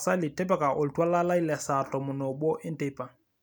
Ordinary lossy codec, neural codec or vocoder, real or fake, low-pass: none; none; real; none